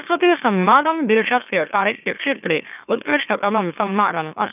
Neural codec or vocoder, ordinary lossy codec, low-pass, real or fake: autoencoder, 44.1 kHz, a latent of 192 numbers a frame, MeloTTS; none; 3.6 kHz; fake